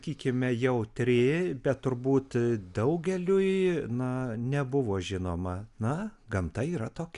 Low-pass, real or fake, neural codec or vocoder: 10.8 kHz; real; none